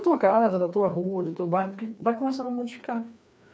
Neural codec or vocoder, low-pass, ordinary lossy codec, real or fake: codec, 16 kHz, 2 kbps, FreqCodec, larger model; none; none; fake